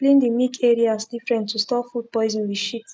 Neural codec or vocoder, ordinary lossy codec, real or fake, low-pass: none; none; real; none